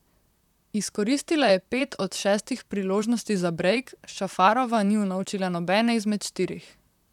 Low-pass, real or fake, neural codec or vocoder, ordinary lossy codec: 19.8 kHz; fake; vocoder, 44.1 kHz, 128 mel bands, Pupu-Vocoder; none